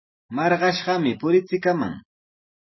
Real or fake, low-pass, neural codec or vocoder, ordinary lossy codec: real; 7.2 kHz; none; MP3, 24 kbps